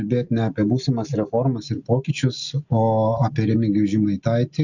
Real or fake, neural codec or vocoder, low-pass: real; none; 7.2 kHz